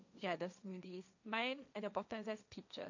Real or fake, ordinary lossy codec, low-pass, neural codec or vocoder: fake; none; 7.2 kHz; codec, 16 kHz, 1.1 kbps, Voila-Tokenizer